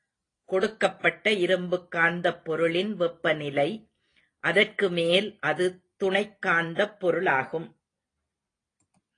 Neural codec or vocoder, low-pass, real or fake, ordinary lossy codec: none; 9.9 kHz; real; AAC, 32 kbps